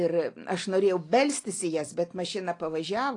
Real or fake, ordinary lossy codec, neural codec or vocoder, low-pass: real; AAC, 48 kbps; none; 10.8 kHz